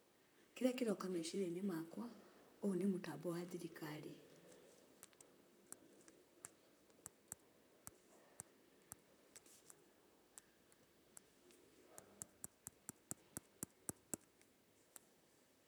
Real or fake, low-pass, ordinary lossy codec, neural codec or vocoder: fake; none; none; vocoder, 44.1 kHz, 128 mel bands, Pupu-Vocoder